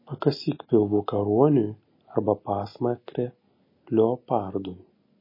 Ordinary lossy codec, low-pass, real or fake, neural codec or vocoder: MP3, 24 kbps; 5.4 kHz; real; none